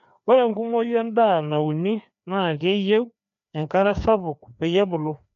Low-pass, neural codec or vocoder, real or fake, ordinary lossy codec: 7.2 kHz; codec, 16 kHz, 2 kbps, FreqCodec, larger model; fake; none